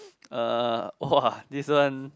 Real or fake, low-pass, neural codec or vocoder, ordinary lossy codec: real; none; none; none